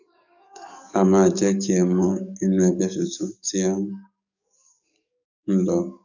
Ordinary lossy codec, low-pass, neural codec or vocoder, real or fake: none; 7.2 kHz; codec, 44.1 kHz, 7.8 kbps, DAC; fake